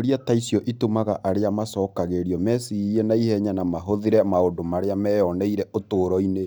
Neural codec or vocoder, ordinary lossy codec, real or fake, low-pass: none; none; real; none